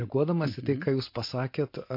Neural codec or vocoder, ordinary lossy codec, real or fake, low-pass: none; MP3, 32 kbps; real; 5.4 kHz